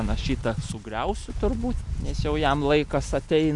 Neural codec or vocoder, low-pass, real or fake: none; 10.8 kHz; real